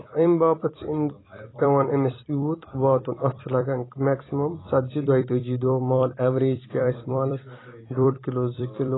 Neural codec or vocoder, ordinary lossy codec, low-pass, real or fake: vocoder, 44.1 kHz, 128 mel bands every 256 samples, BigVGAN v2; AAC, 16 kbps; 7.2 kHz; fake